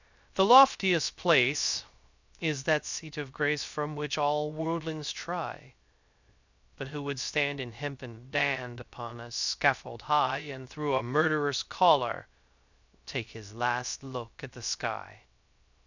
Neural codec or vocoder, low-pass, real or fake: codec, 16 kHz, 0.3 kbps, FocalCodec; 7.2 kHz; fake